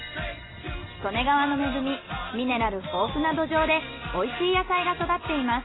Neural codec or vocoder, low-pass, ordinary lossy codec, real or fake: none; 7.2 kHz; AAC, 16 kbps; real